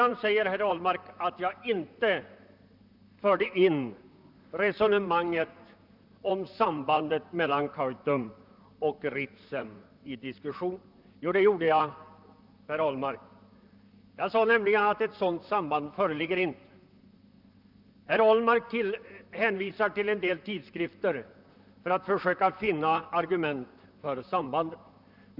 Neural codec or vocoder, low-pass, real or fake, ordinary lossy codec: vocoder, 44.1 kHz, 128 mel bands, Pupu-Vocoder; 5.4 kHz; fake; none